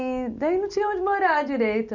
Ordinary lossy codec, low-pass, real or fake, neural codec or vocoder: none; 7.2 kHz; real; none